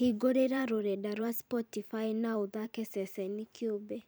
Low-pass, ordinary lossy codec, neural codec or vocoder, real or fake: none; none; vocoder, 44.1 kHz, 128 mel bands every 512 samples, BigVGAN v2; fake